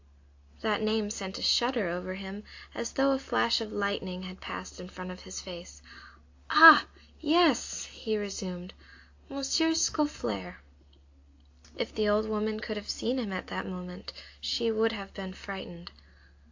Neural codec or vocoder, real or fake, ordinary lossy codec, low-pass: none; real; AAC, 48 kbps; 7.2 kHz